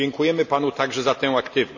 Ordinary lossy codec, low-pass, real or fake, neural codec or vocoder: none; 7.2 kHz; real; none